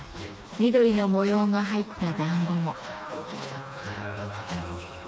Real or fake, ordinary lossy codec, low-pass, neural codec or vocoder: fake; none; none; codec, 16 kHz, 2 kbps, FreqCodec, smaller model